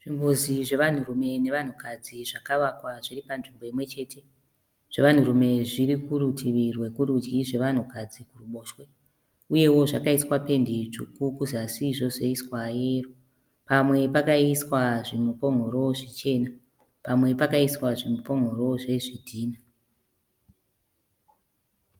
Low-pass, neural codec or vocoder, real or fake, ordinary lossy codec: 19.8 kHz; none; real; Opus, 32 kbps